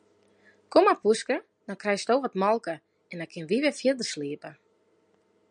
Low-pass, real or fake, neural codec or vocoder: 9.9 kHz; real; none